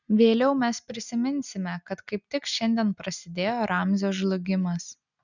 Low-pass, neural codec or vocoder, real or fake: 7.2 kHz; none; real